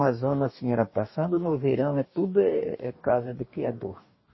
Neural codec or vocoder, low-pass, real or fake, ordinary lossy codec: codec, 44.1 kHz, 2.6 kbps, DAC; 7.2 kHz; fake; MP3, 24 kbps